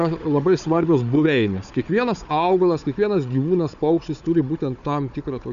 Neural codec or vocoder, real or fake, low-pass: codec, 16 kHz, 4 kbps, FunCodec, trained on Chinese and English, 50 frames a second; fake; 7.2 kHz